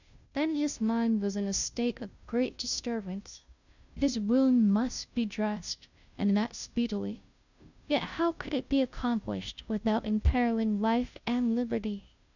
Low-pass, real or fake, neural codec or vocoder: 7.2 kHz; fake; codec, 16 kHz, 0.5 kbps, FunCodec, trained on Chinese and English, 25 frames a second